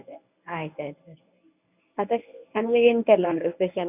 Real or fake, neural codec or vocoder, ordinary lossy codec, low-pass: fake; codec, 24 kHz, 0.9 kbps, WavTokenizer, medium speech release version 1; none; 3.6 kHz